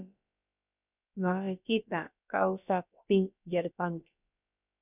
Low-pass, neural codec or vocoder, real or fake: 3.6 kHz; codec, 16 kHz, about 1 kbps, DyCAST, with the encoder's durations; fake